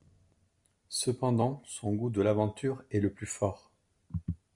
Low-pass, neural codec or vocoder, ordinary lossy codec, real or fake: 10.8 kHz; none; Opus, 64 kbps; real